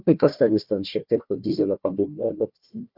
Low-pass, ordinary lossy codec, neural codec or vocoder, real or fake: 5.4 kHz; Opus, 64 kbps; codec, 16 kHz, 1 kbps, FunCodec, trained on Chinese and English, 50 frames a second; fake